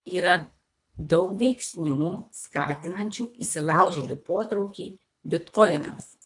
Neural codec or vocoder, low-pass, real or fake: codec, 24 kHz, 1.5 kbps, HILCodec; 10.8 kHz; fake